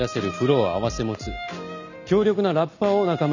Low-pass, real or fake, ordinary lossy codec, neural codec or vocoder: 7.2 kHz; real; none; none